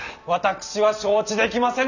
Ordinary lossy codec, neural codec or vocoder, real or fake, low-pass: none; none; real; 7.2 kHz